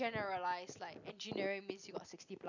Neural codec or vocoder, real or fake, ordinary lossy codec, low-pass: none; real; none; 7.2 kHz